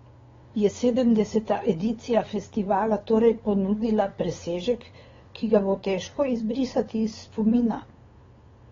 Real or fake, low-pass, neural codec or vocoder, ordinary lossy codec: fake; 7.2 kHz; codec, 16 kHz, 8 kbps, FunCodec, trained on LibriTTS, 25 frames a second; AAC, 24 kbps